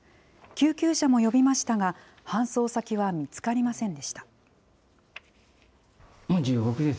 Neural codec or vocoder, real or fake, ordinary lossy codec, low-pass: none; real; none; none